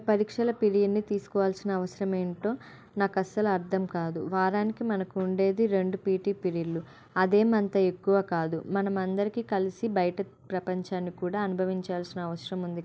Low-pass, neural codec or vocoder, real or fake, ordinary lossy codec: none; none; real; none